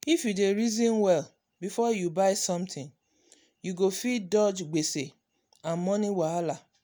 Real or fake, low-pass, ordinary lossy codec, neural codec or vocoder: real; none; none; none